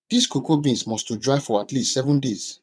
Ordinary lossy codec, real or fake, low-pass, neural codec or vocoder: none; fake; none; vocoder, 22.05 kHz, 80 mel bands, WaveNeXt